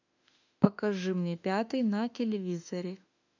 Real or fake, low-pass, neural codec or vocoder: fake; 7.2 kHz; autoencoder, 48 kHz, 32 numbers a frame, DAC-VAE, trained on Japanese speech